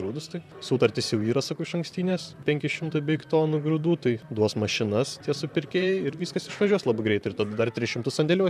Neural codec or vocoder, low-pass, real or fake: vocoder, 44.1 kHz, 128 mel bands, Pupu-Vocoder; 14.4 kHz; fake